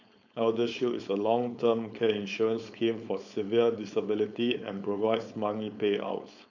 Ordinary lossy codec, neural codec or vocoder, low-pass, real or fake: none; codec, 16 kHz, 4.8 kbps, FACodec; 7.2 kHz; fake